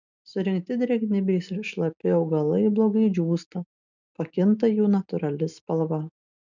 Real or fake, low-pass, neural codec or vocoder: real; 7.2 kHz; none